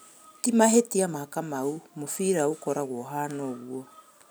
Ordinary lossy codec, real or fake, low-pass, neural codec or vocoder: none; real; none; none